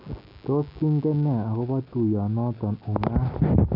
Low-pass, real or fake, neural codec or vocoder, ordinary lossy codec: 5.4 kHz; real; none; MP3, 48 kbps